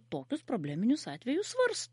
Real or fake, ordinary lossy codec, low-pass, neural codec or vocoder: real; MP3, 48 kbps; 19.8 kHz; none